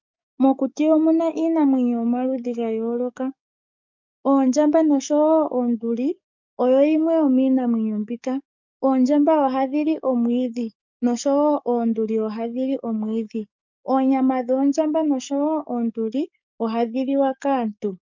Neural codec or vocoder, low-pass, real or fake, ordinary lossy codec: codec, 44.1 kHz, 7.8 kbps, DAC; 7.2 kHz; fake; MP3, 64 kbps